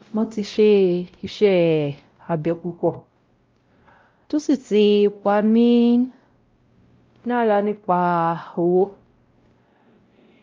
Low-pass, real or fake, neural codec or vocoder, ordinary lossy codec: 7.2 kHz; fake; codec, 16 kHz, 0.5 kbps, X-Codec, WavLM features, trained on Multilingual LibriSpeech; Opus, 24 kbps